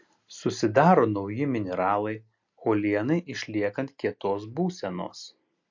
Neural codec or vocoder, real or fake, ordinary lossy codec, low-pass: none; real; MP3, 48 kbps; 7.2 kHz